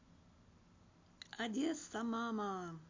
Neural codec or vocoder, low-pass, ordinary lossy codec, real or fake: none; 7.2 kHz; MP3, 48 kbps; real